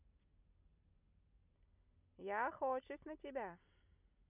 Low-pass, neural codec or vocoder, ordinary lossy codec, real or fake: 3.6 kHz; none; none; real